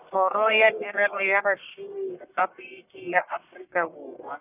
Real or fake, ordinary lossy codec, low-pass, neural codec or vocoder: fake; none; 3.6 kHz; codec, 44.1 kHz, 1.7 kbps, Pupu-Codec